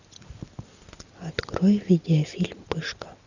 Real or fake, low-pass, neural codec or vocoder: real; 7.2 kHz; none